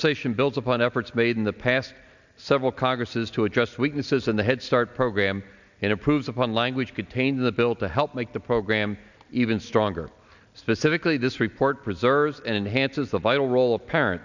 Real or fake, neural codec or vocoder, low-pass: real; none; 7.2 kHz